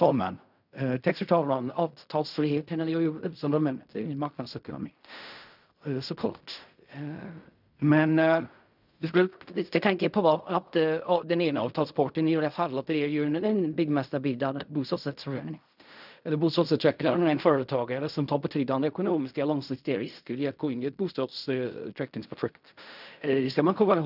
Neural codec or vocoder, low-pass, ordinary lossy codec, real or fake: codec, 16 kHz in and 24 kHz out, 0.4 kbps, LongCat-Audio-Codec, fine tuned four codebook decoder; 5.4 kHz; none; fake